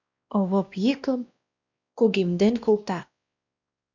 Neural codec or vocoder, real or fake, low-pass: codec, 16 kHz, 1 kbps, X-Codec, WavLM features, trained on Multilingual LibriSpeech; fake; 7.2 kHz